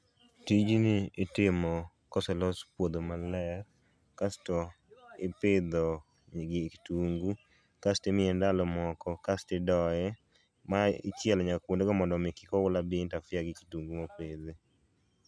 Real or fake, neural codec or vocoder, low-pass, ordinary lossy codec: real; none; none; none